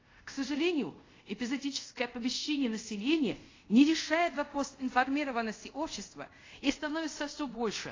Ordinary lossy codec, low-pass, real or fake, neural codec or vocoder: AAC, 32 kbps; 7.2 kHz; fake; codec, 24 kHz, 0.5 kbps, DualCodec